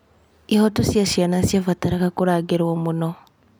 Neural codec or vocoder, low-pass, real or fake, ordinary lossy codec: none; none; real; none